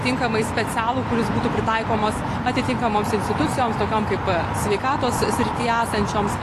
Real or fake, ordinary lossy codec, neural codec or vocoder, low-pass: real; AAC, 48 kbps; none; 14.4 kHz